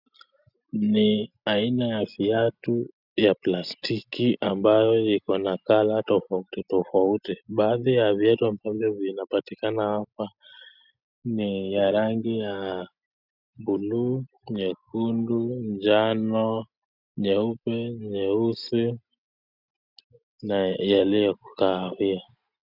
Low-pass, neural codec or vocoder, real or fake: 5.4 kHz; none; real